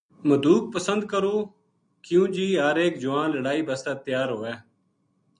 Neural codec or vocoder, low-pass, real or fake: none; 9.9 kHz; real